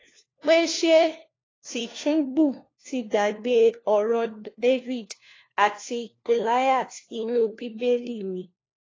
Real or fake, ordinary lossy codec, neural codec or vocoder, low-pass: fake; AAC, 32 kbps; codec, 16 kHz, 1 kbps, FunCodec, trained on LibriTTS, 50 frames a second; 7.2 kHz